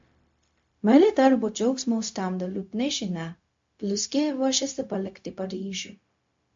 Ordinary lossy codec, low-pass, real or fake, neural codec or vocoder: MP3, 48 kbps; 7.2 kHz; fake; codec, 16 kHz, 0.4 kbps, LongCat-Audio-Codec